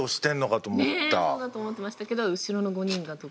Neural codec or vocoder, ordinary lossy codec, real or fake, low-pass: none; none; real; none